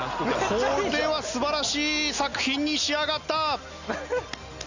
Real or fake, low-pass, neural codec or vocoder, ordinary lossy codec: real; 7.2 kHz; none; none